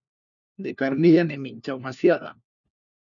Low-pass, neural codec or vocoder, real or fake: 7.2 kHz; codec, 16 kHz, 1 kbps, FunCodec, trained on LibriTTS, 50 frames a second; fake